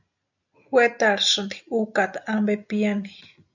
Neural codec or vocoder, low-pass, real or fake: none; 7.2 kHz; real